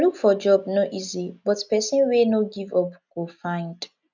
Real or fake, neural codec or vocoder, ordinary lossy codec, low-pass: real; none; none; 7.2 kHz